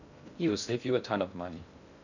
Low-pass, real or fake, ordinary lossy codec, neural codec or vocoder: 7.2 kHz; fake; none; codec, 16 kHz in and 24 kHz out, 0.6 kbps, FocalCodec, streaming, 2048 codes